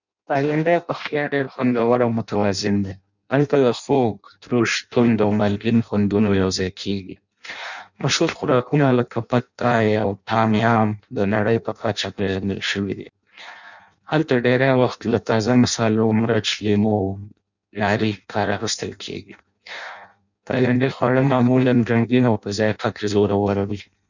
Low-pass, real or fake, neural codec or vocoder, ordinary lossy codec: 7.2 kHz; fake; codec, 16 kHz in and 24 kHz out, 0.6 kbps, FireRedTTS-2 codec; none